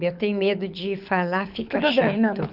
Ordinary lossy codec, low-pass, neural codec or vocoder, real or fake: none; 5.4 kHz; codec, 24 kHz, 6 kbps, HILCodec; fake